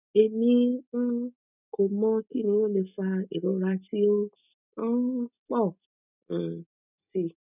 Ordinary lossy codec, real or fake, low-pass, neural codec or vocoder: none; real; 3.6 kHz; none